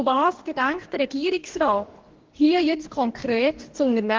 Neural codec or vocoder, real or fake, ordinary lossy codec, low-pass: codec, 44.1 kHz, 2.6 kbps, DAC; fake; Opus, 16 kbps; 7.2 kHz